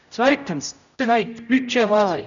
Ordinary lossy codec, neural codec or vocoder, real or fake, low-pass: none; codec, 16 kHz, 0.5 kbps, X-Codec, HuBERT features, trained on general audio; fake; 7.2 kHz